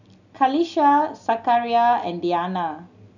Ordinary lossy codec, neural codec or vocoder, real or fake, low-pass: none; none; real; 7.2 kHz